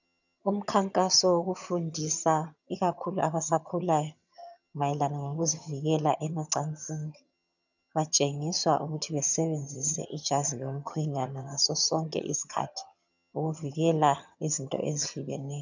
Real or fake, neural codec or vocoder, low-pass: fake; vocoder, 22.05 kHz, 80 mel bands, HiFi-GAN; 7.2 kHz